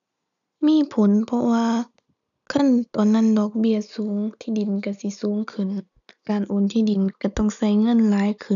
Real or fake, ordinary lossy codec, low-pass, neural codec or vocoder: real; none; 7.2 kHz; none